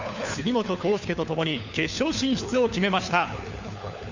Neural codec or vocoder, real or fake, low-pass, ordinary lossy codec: codec, 16 kHz, 4 kbps, FunCodec, trained on LibriTTS, 50 frames a second; fake; 7.2 kHz; none